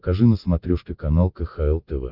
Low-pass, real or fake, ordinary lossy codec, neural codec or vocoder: 5.4 kHz; real; Opus, 32 kbps; none